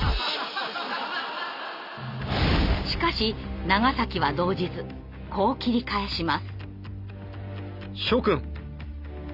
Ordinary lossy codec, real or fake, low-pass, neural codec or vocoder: Opus, 64 kbps; real; 5.4 kHz; none